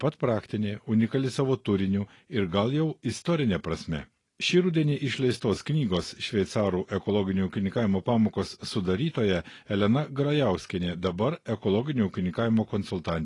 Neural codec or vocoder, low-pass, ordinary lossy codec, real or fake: none; 10.8 kHz; AAC, 32 kbps; real